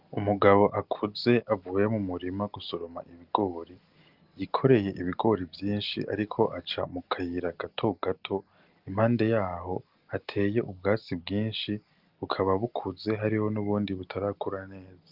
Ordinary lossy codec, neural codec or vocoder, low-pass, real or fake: Opus, 32 kbps; none; 5.4 kHz; real